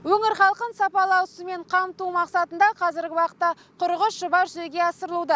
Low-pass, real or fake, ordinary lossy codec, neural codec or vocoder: none; real; none; none